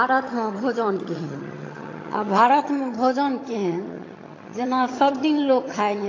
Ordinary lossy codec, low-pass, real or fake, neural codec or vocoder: AAC, 32 kbps; 7.2 kHz; fake; vocoder, 22.05 kHz, 80 mel bands, HiFi-GAN